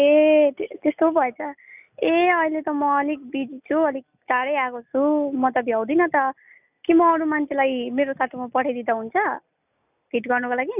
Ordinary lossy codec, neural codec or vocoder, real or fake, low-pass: none; none; real; 3.6 kHz